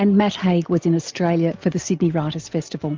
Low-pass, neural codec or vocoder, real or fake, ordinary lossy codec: 7.2 kHz; none; real; Opus, 24 kbps